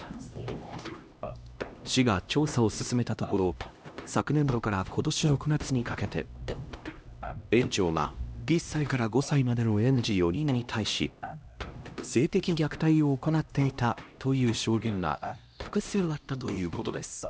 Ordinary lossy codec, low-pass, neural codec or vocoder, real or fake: none; none; codec, 16 kHz, 1 kbps, X-Codec, HuBERT features, trained on LibriSpeech; fake